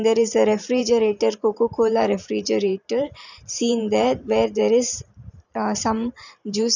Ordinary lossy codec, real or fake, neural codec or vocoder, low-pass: none; fake; vocoder, 44.1 kHz, 128 mel bands every 512 samples, BigVGAN v2; 7.2 kHz